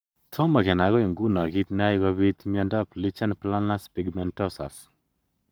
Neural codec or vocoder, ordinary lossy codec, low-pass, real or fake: codec, 44.1 kHz, 7.8 kbps, Pupu-Codec; none; none; fake